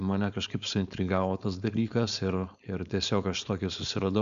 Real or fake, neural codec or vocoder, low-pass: fake; codec, 16 kHz, 4.8 kbps, FACodec; 7.2 kHz